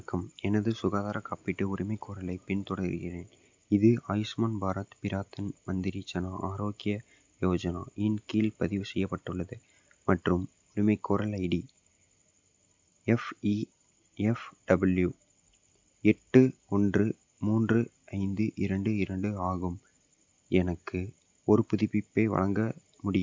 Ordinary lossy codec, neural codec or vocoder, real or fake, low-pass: MP3, 64 kbps; none; real; 7.2 kHz